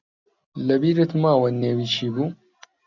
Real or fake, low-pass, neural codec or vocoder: real; 7.2 kHz; none